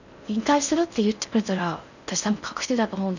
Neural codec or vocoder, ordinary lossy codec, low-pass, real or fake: codec, 16 kHz in and 24 kHz out, 0.6 kbps, FocalCodec, streaming, 4096 codes; none; 7.2 kHz; fake